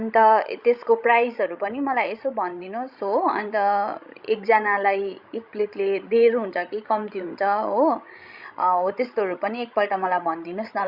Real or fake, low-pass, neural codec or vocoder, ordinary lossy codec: fake; 5.4 kHz; codec, 16 kHz, 16 kbps, FreqCodec, larger model; Opus, 64 kbps